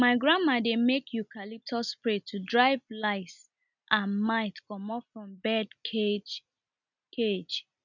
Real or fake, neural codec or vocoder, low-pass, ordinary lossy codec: real; none; 7.2 kHz; none